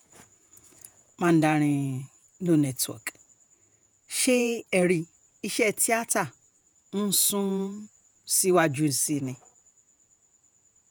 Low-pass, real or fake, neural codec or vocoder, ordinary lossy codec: none; fake; vocoder, 48 kHz, 128 mel bands, Vocos; none